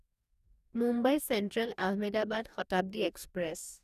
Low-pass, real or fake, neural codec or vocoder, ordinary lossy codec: 14.4 kHz; fake; codec, 44.1 kHz, 2.6 kbps, DAC; none